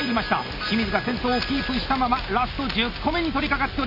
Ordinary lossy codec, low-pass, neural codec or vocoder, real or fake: none; 5.4 kHz; none; real